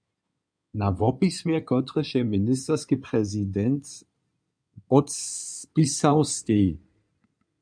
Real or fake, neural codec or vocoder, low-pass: fake; codec, 16 kHz in and 24 kHz out, 2.2 kbps, FireRedTTS-2 codec; 9.9 kHz